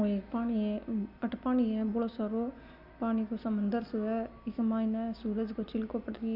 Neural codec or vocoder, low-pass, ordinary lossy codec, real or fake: none; 5.4 kHz; none; real